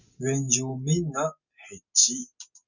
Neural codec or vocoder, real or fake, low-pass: none; real; 7.2 kHz